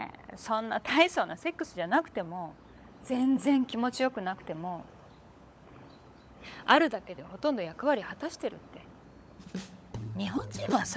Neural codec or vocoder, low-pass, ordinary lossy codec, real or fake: codec, 16 kHz, 16 kbps, FunCodec, trained on LibriTTS, 50 frames a second; none; none; fake